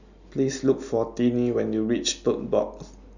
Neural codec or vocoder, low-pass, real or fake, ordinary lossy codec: none; 7.2 kHz; real; none